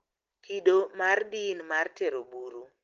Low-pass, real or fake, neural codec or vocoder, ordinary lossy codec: 7.2 kHz; real; none; Opus, 16 kbps